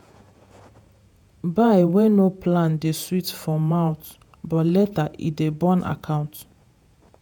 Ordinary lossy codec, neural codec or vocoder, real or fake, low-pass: none; vocoder, 48 kHz, 128 mel bands, Vocos; fake; none